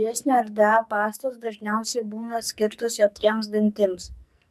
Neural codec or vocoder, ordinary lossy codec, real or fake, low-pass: codec, 44.1 kHz, 2.6 kbps, SNAC; MP3, 96 kbps; fake; 14.4 kHz